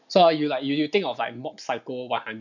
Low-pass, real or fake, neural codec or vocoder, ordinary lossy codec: 7.2 kHz; real; none; none